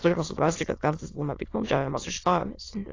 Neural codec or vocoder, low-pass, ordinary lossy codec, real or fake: autoencoder, 22.05 kHz, a latent of 192 numbers a frame, VITS, trained on many speakers; 7.2 kHz; AAC, 32 kbps; fake